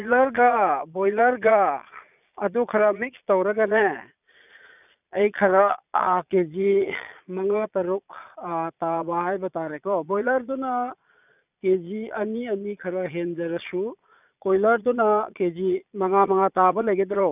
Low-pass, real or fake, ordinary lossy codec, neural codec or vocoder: 3.6 kHz; fake; none; vocoder, 22.05 kHz, 80 mel bands, Vocos